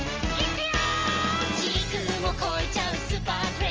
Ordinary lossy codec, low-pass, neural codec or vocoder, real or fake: Opus, 24 kbps; 7.2 kHz; none; real